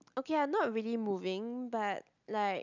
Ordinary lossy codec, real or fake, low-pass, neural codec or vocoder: none; real; 7.2 kHz; none